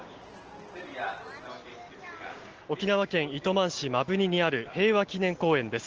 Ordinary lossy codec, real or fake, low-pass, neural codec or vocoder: Opus, 16 kbps; real; 7.2 kHz; none